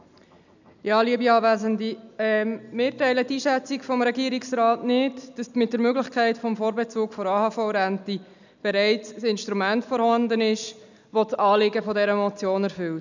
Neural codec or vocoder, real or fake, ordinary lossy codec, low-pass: none; real; none; 7.2 kHz